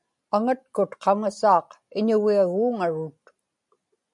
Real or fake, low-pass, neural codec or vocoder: real; 10.8 kHz; none